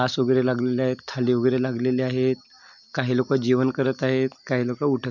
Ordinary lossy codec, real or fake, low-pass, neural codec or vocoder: MP3, 64 kbps; real; 7.2 kHz; none